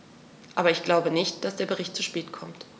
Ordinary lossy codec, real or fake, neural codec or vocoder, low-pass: none; real; none; none